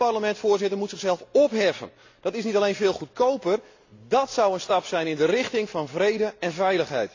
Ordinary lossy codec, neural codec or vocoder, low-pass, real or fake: AAC, 48 kbps; none; 7.2 kHz; real